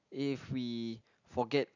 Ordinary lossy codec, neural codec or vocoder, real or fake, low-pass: none; none; real; 7.2 kHz